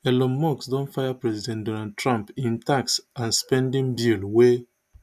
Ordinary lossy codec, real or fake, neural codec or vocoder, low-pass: none; real; none; 14.4 kHz